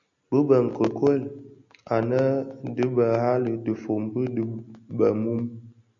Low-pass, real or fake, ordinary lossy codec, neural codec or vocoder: 7.2 kHz; real; MP3, 64 kbps; none